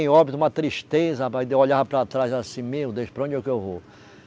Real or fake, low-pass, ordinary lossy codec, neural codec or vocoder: real; none; none; none